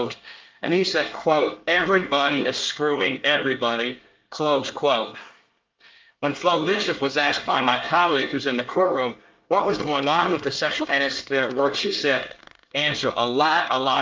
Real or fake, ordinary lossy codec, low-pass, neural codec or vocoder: fake; Opus, 24 kbps; 7.2 kHz; codec, 24 kHz, 1 kbps, SNAC